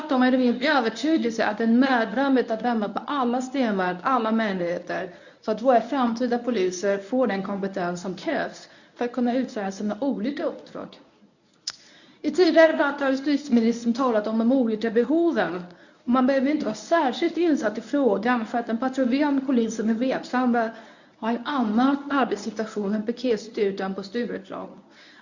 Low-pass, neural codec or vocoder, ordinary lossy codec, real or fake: 7.2 kHz; codec, 24 kHz, 0.9 kbps, WavTokenizer, medium speech release version 1; none; fake